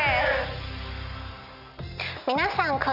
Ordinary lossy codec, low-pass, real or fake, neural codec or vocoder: none; 5.4 kHz; real; none